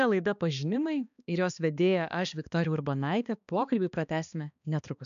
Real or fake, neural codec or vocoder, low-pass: fake; codec, 16 kHz, 2 kbps, X-Codec, HuBERT features, trained on balanced general audio; 7.2 kHz